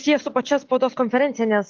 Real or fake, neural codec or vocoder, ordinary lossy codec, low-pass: real; none; Opus, 24 kbps; 7.2 kHz